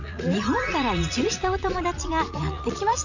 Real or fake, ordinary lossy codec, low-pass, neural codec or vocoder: fake; none; 7.2 kHz; vocoder, 44.1 kHz, 80 mel bands, Vocos